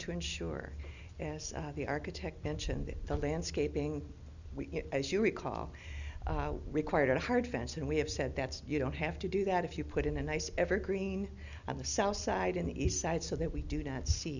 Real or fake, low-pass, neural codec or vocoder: real; 7.2 kHz; none